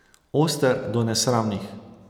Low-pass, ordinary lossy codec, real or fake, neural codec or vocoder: none; none; real; none